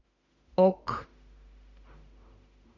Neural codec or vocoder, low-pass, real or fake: autoencoder, 48 kHz, 32 numbers a frame, DAC-VAE, trained on Japanese speech; 7.2 kHz; fake